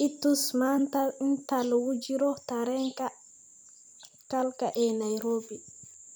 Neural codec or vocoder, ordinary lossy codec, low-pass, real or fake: vocoder, 44.1 kHz, 128 mel bands every 256 samples, BigVGAN v2; none; none; fake